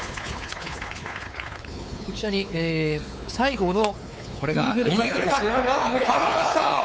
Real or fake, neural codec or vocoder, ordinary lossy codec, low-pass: fake; codec, 16 kHz, 4 kbps, X-Codec, WavLM features, trained on Multilingual LibriSpeech; none; none